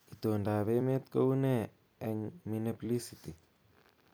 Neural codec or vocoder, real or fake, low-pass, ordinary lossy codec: vocoder, 44.1 kHz, 128 mel bands every 256 samples, BigVGAN v2; fake; none; none